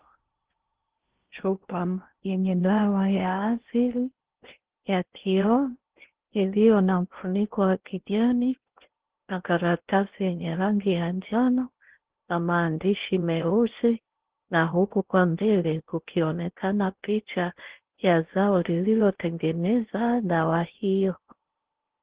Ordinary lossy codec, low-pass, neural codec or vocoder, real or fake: Opus, 16 kbps; 3.6 kHz; codec, 16 kHz in and 24 kHz out, 0.6 kbps, FocalCodec, streaming, 2048 codes; fake